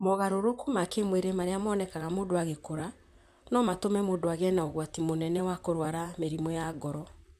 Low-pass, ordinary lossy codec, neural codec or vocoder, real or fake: 14.4 kHz; none; vocoder, 44.1 kHz, 128 mel bands, Pupu-Vocoder; fake